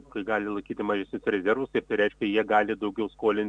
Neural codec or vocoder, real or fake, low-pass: none; real; 9.9 kHz